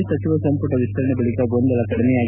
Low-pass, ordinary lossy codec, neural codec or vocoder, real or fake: 3.6 kHz; none; none; real